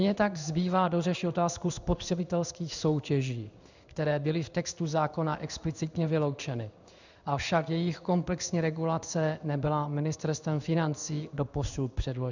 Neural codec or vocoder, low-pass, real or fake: codec, 16 kHz in and 24 kHz out, 1 kbps, XY-Tokenizer; 7.2 kHz; fake